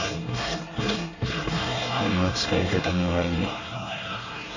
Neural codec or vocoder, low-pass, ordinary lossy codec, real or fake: codec, 24 kHz, 1 kbps, SNAC; 7.2 kHz; none; fake